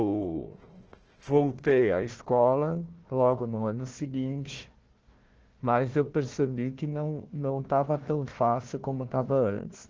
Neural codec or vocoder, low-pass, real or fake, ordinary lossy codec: codec, 16 kHz, 1 kbps, FunCodec, trained on Chinese and English, 50 frames a second; 7.2 kHz; fake; Opus, 16 kbps